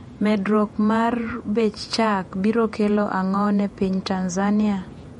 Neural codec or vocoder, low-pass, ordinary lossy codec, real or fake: vocoder, 48 kHz, 128 mel bands, Vocos; 19.8 kHz; MP3, 48 kbps; fake